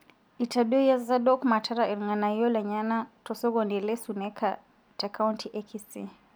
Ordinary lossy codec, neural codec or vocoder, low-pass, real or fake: none; none; none; real